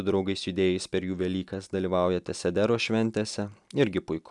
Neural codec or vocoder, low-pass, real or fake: none; 10.8 kHz; real